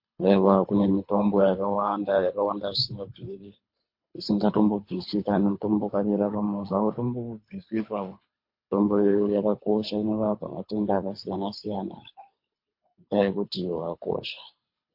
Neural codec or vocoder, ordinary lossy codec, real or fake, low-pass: codec, 24 kHz, 3 kbps, HILCodec; MP3, 32 kbps; fake; 5.4 kHz